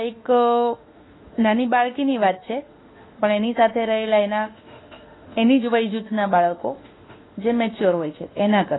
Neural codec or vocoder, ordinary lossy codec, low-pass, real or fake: autoencoder, 48 kHz, 32 numbers a frame, DAC-VAE, trained on Japanese speech; AAC, 16 kbps; 7.2 kHz; fake